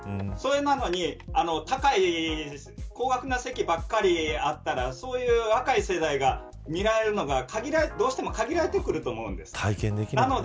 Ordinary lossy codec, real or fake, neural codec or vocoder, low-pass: none; real; none; none